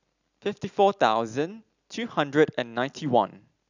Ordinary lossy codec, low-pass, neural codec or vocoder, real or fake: none; 7.2 kHz; none; real